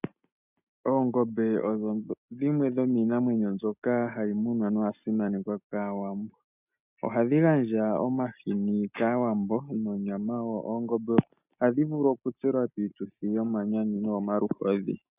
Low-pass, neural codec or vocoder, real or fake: 3.6 kHz; none; real